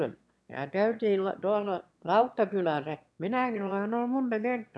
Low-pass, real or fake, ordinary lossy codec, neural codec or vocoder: none; fake; none; autoencoder, 22.05 kHz, a latent of 192 numbers a frame, VITS, trained on one speaker